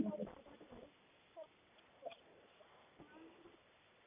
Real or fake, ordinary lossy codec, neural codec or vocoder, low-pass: real; none; none; 3.6 kHz